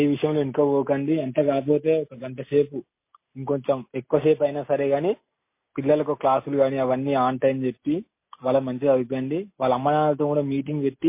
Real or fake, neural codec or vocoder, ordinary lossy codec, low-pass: real; none; MP3, 24 kbps; 3.6 kHz